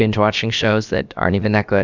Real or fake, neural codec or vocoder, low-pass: fake; codec, 16 kHz, about 1 kbps, DyCAST, with the encoder's durations; 7.2 kHz